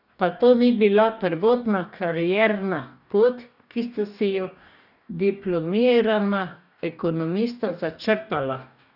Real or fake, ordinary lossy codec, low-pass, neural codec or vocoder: fake; none; 5.4 kHz; codec, 44.1 kHz, 2.6 kbps, DAC